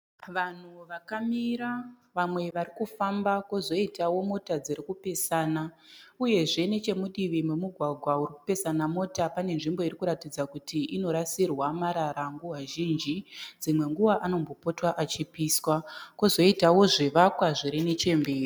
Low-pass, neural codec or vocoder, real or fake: 19.8 kHz; none; real